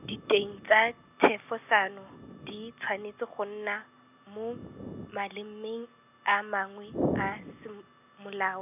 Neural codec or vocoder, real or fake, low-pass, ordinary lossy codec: none; real; 3.6 kHz; none